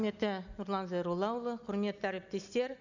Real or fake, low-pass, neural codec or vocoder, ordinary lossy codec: real; 7.2 kHz; none; none